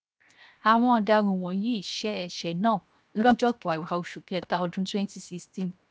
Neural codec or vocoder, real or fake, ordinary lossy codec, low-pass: codec, 16 kHz, 0.7 kbps, FocalCodec; fake; none; none